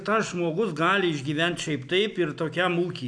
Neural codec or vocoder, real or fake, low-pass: none; real; 9.9 kHz